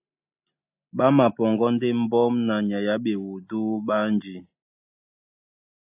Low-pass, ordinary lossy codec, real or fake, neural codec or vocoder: 3.6 kHz; AAC, 32 kbps; real; none